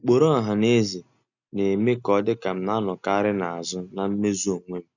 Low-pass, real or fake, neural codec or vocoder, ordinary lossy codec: 7.2 kHz; real; none; none